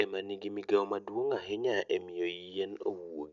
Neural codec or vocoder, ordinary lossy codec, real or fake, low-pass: none; none; real; 7.2 kHz